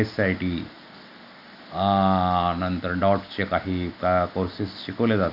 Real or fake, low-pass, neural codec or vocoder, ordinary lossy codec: real; 5.4 kHz; none; none